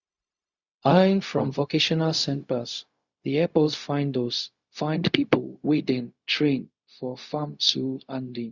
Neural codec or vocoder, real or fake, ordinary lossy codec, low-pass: codec, 16 kHz, 0.4 kbps, LongCat-Audio-Codec; fake; Opus, 64 kbps; 7.2 kHz